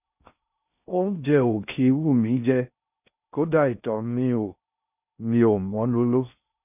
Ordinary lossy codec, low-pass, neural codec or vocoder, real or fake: none; 3.6 kHz; codec, 16 kHz in and 24 kHz out, 0.6 kbps, FocalCodec, streaming, 2048 codes; fake